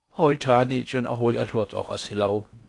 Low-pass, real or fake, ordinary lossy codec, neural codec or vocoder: 10.8 kHz; fake; AAC, 64 kbps; codec, 16 kHz in and 24 kHz out, 0.6 kbps, FocalCodec, streaming, 4096 codes